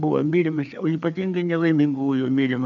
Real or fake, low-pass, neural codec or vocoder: fake; 7.2 kHz; codec, 16 kHz, 4 kbps, FreqCodec, larger model